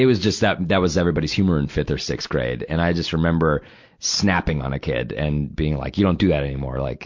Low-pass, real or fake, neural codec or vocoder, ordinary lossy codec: 7.2 kHz; real; none; MP3, 48 kbps